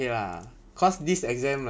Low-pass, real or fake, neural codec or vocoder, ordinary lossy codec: none; real; none; none